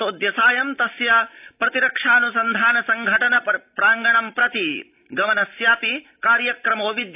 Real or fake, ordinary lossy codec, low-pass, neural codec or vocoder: real; AAC, 32 kbps; 3.6 kHz; none